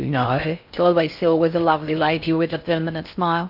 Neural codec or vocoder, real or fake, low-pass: codec, 16 kHz in and 24 kHz out, 0.6 kbps, FocalCodec, streaming, 4096 codes; fake; 5.4 kHz